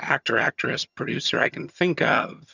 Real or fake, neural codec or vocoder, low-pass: fake; vocoder, 22.05 kHz, 80 mel bands, HiFi-GAN; 7.2 kHz